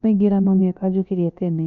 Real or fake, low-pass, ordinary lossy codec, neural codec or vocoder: fake; 7.2 kHz; none; codec, 16 kHz, about 1 kbps, DyCAST, with the encoder's durations